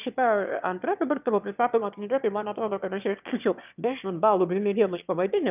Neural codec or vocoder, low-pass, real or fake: autoencoder, 22.05 kHz, a latent of 192 numbers a frame, VITS, trained on one speaker; 3.6 kHz; fake